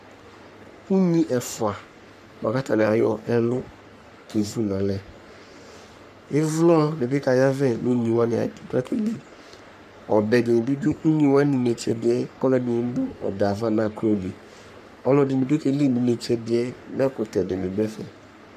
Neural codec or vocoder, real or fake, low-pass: codec, 44.1 kHz, 3.4 kbps, Pupu-Codec; fake; 14.4 kHz